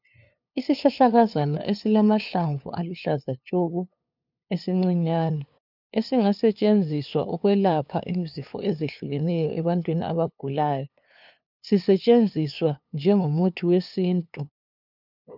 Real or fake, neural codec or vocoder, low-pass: fake; codec, 16 kHz, 2 kbps, FunCodec, trained on LibriTTS, 25 frames a second; 5.4 kHz